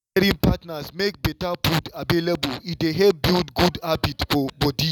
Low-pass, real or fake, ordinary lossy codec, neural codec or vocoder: 19.8 kHz; real; none; none